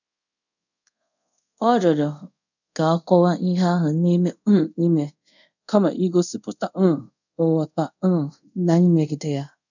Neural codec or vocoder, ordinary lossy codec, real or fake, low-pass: codec, 24 kHz, 0.5 kbps, DualCodec; none; fake; 7.2 kHz